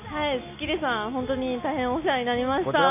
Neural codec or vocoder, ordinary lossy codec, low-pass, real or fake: none; none; 3.6 kHz; real